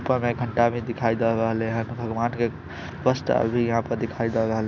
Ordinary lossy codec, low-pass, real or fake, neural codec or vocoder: none; 7.2 kHz; real; none